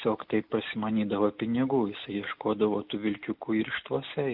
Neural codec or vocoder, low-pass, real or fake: none; 5.4 kHz; real